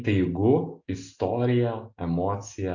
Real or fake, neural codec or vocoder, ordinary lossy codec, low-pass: real; none; AAC, 48 kbps; 7.2 kHz